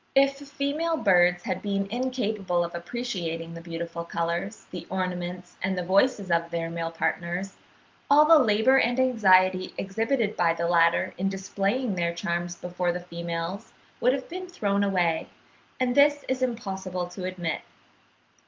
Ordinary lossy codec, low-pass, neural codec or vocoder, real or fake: Opus, 32 kbps; 7.2 kHz; none; real